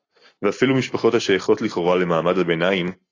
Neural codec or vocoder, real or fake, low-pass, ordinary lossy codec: none; real; 7.2 kHz; AAC, 32 kbps